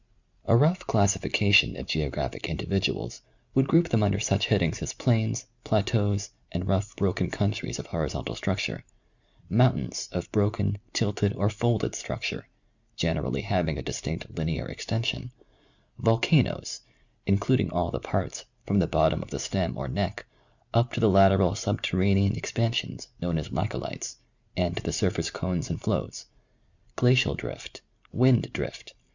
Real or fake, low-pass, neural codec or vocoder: real; 7.2 kHz; none